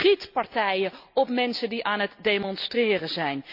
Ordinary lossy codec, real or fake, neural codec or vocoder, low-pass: none; real; none; 5.4 kHz